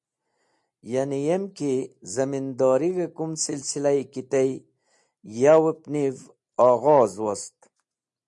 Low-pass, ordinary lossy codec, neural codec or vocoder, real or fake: 10.8 kHz; MP3, 64 kbps; none; real